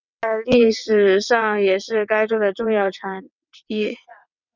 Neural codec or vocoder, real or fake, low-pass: vocoder, 22.05 kHz, 80 mel bands, WaveNeXt; fake; 7.2 kHz